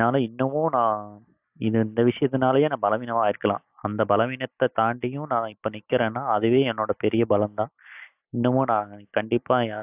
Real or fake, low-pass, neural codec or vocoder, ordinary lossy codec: real; 3.6 kHz; none; AAC, 32 kbps